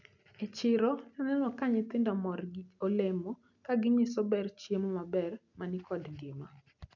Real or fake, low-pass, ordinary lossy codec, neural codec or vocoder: real; 7.2 kHz; none; none